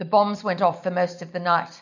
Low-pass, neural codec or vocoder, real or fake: 7.2 kHz; none; real